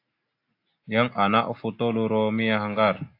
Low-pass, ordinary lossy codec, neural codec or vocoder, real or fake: 5.4 kHz; AAC, 32 kbps; none; real